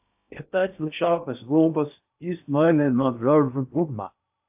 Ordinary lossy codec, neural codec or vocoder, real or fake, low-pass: AAC, 32 kbps; codec, 16 kHz in and 24 kHz out, 0.6 kbps, FocalCodec, streaming, 2048 codes; fake; 3.6 kHz